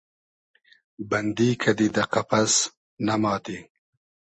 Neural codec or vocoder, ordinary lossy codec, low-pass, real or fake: none; MP3, 32 kbps; 10.8 kHz; real